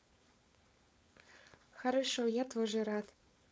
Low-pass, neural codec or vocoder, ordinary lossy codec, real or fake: none; codec, 16 kHz, 4.8 kbps, FACodec; none; fake